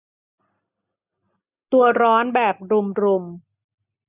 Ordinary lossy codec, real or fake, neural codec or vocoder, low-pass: none; real; none; 3.6 kHz